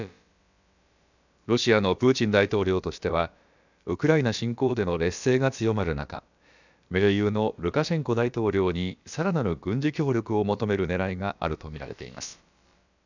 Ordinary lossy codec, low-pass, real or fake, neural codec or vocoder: none; 7.2 kHz; fake; codec, 16 kHz, about 1 kbps, DyCAST, with the encoder's durations